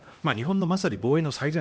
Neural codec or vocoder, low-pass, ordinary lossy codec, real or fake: codec, 16 kHz, 1 kbps, X-Codec, HuBERT features, trained on LibriSpeech; none; none; fake